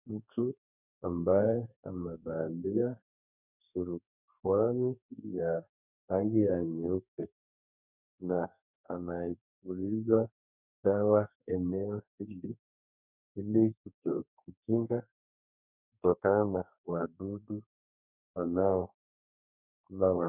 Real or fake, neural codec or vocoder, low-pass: fake; codec, 32 kHz, 1.9 kbps, SNAC; 3.6 kHz